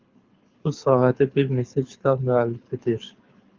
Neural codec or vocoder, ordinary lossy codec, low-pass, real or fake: codec, 24 kHz, 6 kbps, HILCodec; Opus, 24 kbps; 7.2 kHz; fake